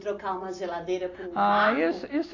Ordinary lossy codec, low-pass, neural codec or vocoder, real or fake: AAC, 32 kbps; 7.2 kHz; none; real